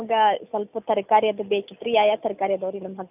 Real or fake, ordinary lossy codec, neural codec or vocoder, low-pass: real; none; none; 3.6 kHz